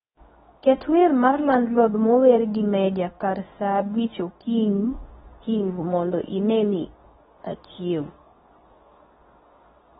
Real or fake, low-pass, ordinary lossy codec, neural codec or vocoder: fake; 10.8 kHz; AAC, 16 kbps; codec, 24 kHz, 0.9 kbps, WavTokenizer, medium speech release version 2